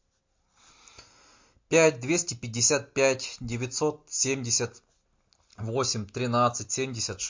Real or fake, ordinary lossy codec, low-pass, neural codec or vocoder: real; MP3, 48 kbps; 7.2 kHz; none